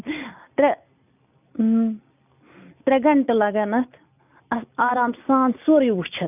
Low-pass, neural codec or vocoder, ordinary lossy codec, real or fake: 3.6 kHz; none; none; real